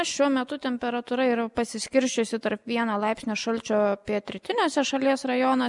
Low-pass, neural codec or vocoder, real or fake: 10.8 kHz; none; real